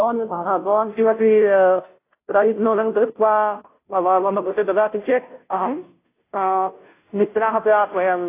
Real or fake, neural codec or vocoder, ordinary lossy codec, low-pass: fake; codec, 16 kHz, 0.5 kbps, FunCodec, trained on Chinese and English, 25 frames a second; AAC, 24 kbps; 3.6 kHz